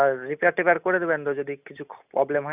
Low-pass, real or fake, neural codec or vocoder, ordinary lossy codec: 3.6 kHz; real; none; none